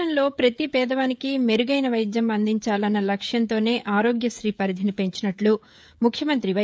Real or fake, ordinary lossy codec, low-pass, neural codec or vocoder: fake; none; none; codec, 16 kHz, 16 kbps, FreqCodec, smaller model